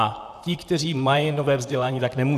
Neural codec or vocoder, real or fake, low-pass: vocoder, 44.1 kHz, 128 mel bands, Pupu-Vocoder; fake; 14.4 kHz